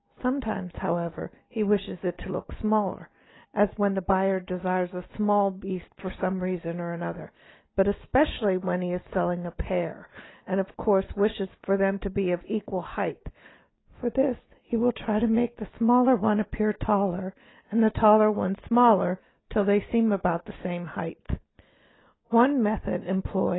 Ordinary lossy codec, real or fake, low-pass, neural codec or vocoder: AAC, 16 kbps; real; 7.2 kHz; none